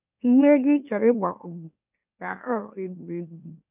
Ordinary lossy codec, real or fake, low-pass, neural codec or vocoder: none; fake; 3.6 kHz; autoencoder, 44.1 kHz, a latent of 192 numbers a frame, MeloTTS